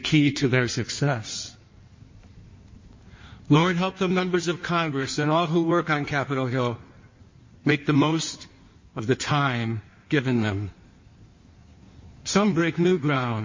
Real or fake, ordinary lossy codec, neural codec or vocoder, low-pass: fake; MP3, 32 kbps; codec, 16 kHz in and 24 kHz out, 1.1 kbps, FireRedTTS-2 codec; 7.2 kHz